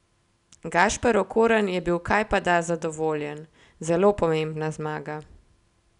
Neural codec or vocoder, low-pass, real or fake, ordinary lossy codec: none; 10.8 kHz; real; none